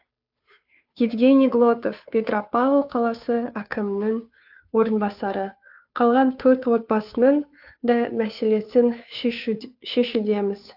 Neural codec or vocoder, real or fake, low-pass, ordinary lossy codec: codec, 16 kHz, 2 kbps, FunCodec, trained on Chinese and English, 25 frames a second; fake; 5.4 kHz; AAC, 48 kbps